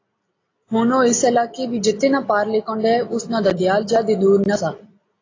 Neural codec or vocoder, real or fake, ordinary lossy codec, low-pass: none; real; AAC, 32 kbps; 7.2 kHz